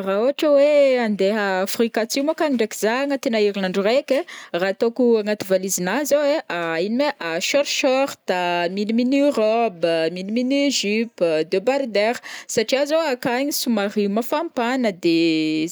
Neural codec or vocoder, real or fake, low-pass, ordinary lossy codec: none; real; none; none